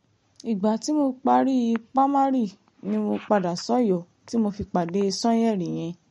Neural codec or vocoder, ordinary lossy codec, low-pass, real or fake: none; MP3, 48 kbps; 19.8 kHz; real